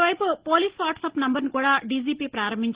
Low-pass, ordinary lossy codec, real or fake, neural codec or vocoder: 3.6 kHz; Opus, 16 kbps; real; none